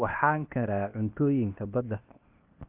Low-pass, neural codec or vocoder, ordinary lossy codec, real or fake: 3.6 kHz; codec, 16 kHz, 0.8 kbps, ZipCodec; Opus, 24 kbps; fake